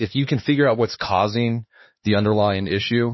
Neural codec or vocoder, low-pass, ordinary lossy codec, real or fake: codec, 16 kHz, 2 kbps, X-Codec, HuBERT features, trained on LibriSpeech; 7.2 kHz; MP3, 24 kbps; fake